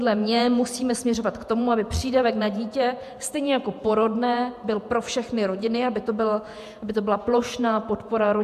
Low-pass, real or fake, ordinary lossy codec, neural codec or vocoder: 14.4 kHz; fake; MP3, 96 kbps; vocoder, 48 kHz, 128 mel bands, Vocos